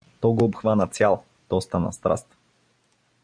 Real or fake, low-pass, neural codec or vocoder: real; 9.9 kHz; none